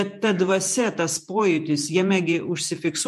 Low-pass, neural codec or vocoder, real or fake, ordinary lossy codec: 14.4 kHz; vocoder, 48 kHz, 128 mel bands, Vocos; fake; MP3, 64 kbps